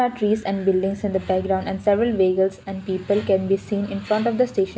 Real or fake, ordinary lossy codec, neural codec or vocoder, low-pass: real; none; none; none